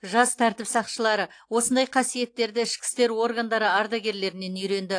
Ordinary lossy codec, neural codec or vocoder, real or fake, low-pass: AAC, 48 kbps; none; real; 9.9 kHz